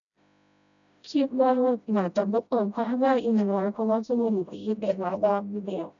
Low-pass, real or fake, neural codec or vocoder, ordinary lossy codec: 7.2 kHz; fake; codec, 16 kHz, 0.5 kbps, FreqCodec, smaller model; none